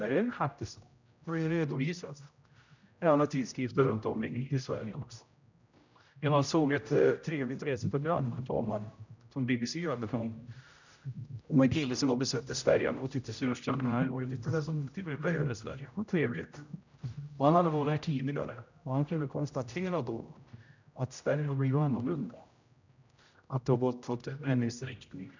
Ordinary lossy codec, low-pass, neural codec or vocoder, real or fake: none; 7.2 kHz; codec, 16 kHz, 0.5 kbps, X-Codec, HuBERT features, trained on general audio; fake